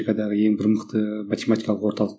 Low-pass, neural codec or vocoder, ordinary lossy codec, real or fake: none; none; none; real